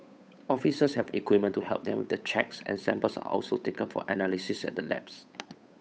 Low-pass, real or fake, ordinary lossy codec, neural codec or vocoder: none; fake; none; codec, 16 kHz, 8 kbps, FunCodec, trained on Chinese and English, 25 frames a second